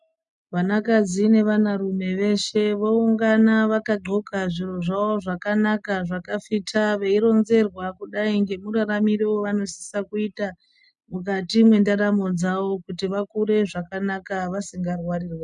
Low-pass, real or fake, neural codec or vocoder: 10.8 kHz; real; none